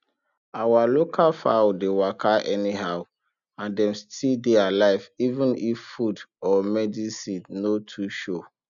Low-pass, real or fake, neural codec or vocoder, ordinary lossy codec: 7.2 kHz; real; none; MP3, 96 kbps